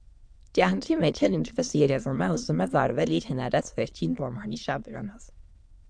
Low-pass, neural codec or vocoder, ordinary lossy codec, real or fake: 9.9 kHz; autoencoder, 22.05 kHz, a latent of 192 numbers a frame, VITS, trained on many speakers; MP3, 64 kbps; fake